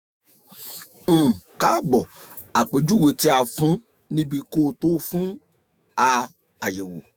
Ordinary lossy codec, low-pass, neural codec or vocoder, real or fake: none; none; autoencoder, 48 kHz, 128 numbers a frame, DAC-VAE, trained on Japanese speech; fake